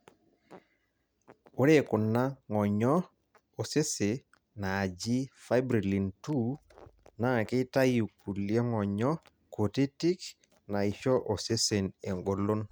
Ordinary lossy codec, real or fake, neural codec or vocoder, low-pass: none; real; none; none